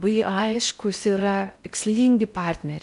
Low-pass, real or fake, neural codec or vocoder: 10.8 kHz; fake; codec, 16 kHz in and 24 kHz out, 0.6 kbps, FocalCodec, streaming, 4096 codes